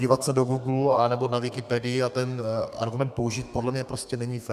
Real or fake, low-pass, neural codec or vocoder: fake; 14.4 kHz; codec, 32 kHz, 1.9 kbps, SNAC